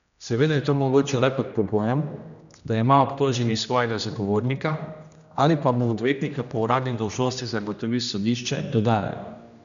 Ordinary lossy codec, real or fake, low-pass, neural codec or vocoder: none; fake; 7.2 kHz; codec, 16 kHz, 1 kbps, X-Codec, HuBERT features, trained on general audio